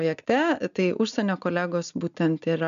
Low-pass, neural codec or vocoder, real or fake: 7.2 kHz; none; real